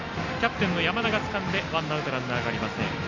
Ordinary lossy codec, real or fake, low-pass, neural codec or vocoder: Opus, 64 kbps; real; 7.2 kHz; none